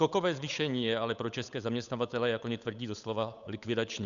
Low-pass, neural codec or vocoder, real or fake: 7.2 kHz; codec, 16 kHz, 8 kbps, FunCodec, trained on Chinese and English, 25 frames a second; fake